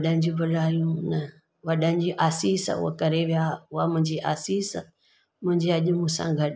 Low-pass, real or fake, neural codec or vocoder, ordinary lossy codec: none; real; none; none